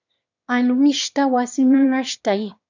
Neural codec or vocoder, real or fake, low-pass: autoencoder, 22.05 kHz, a latent of 192 numbers a frame, VITS, trained on one speaker; fake; 7.2 kHz